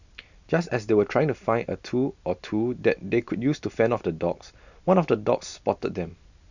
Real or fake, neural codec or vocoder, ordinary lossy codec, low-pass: real; none; none; 7.2 kHz